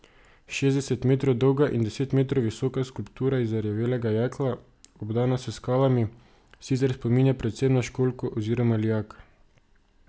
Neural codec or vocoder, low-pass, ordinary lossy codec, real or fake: none; none; none; real